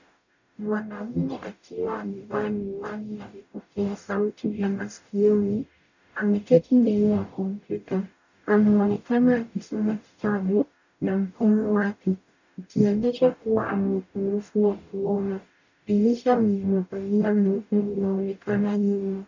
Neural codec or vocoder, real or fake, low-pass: codec, 44.1 kHz, 0.9 kbps, DAC; fake; 7.2 kHz